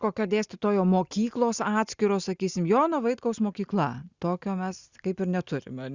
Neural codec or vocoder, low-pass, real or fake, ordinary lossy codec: none; 7.2 kHz; real; Opus, 64 kbps